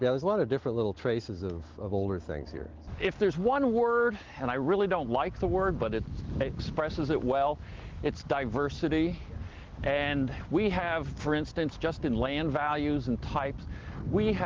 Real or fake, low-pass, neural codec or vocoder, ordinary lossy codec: real; 7.2 kHz; none; Opus, 16 kbps